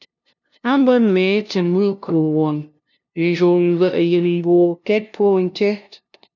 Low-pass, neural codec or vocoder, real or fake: 7.2 kHz; codec, 16 kHz, 0.5 kbps, FunCodec, trained on LibriTTS, 25 frames a second; fake